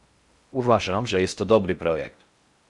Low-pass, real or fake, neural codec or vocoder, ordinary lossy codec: 10.8 kHz; fake; codec, 16 kHz in and 24 kHz out, 0.6 kbps, FocalCodec, streaming, 2048 codes; Opus, 64 kbps